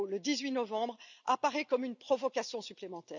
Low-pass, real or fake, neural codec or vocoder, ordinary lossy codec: 7.2 kHz; real; none; none